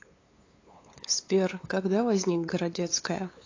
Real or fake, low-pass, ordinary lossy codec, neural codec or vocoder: fake; 7.2 kHz; AAC, 32 kbps; codec, 16 kHz, 8 kbps, FunCodec, trained on LibriTTS, 25 frames a second